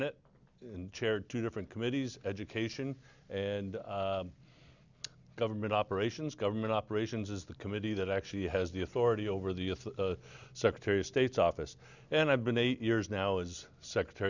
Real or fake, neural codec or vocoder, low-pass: real; none; 7.2 kHz